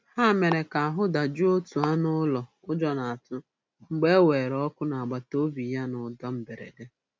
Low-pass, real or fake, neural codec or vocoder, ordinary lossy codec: none; real; none; none